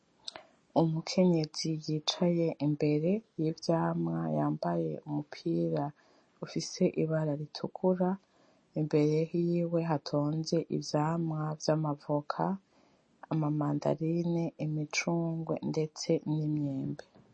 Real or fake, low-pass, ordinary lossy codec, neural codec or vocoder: real; 9.9 kHz; MP3, 32 kbps; none